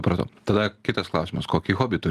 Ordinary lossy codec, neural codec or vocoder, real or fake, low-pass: Opus, 32 kbps; none; real; 14.4 kHz